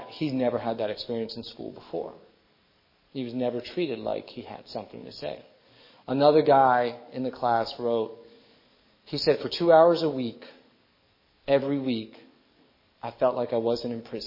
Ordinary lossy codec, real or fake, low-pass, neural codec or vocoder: MP3, 24 kbps; fake; 5.4 kHz; codec, 44.1 kHz, 7.8 kbps, DAC